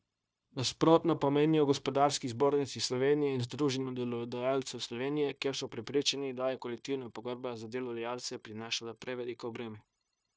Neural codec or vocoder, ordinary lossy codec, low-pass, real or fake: codec, 16 kHz, 0.9 kbps, LongCat-Audio-Codec; none; none; fake